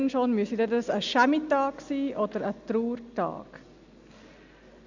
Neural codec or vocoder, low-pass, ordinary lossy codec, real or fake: none; 7.2 kHz; none; real